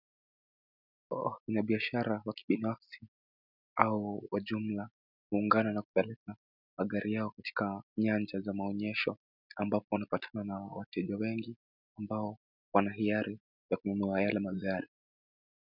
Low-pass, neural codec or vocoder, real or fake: 5.4 kHz; none; real